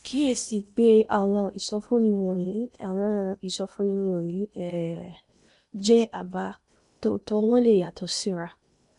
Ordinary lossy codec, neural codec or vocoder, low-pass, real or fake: none; codec, 16 kHz in and 24 kHz out, 0.8 kbps, FocalCodec, streaming, 65536 codes; 10.8 kHz; fake